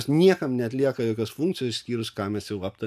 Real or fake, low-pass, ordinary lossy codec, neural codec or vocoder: real; 14.4 kHz; AAC, 96 kbps; none